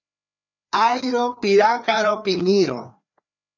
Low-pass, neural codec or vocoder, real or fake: 7.2 kHz; codec, 16 kHz, 2 kbps, FreqCodec, larger model; fake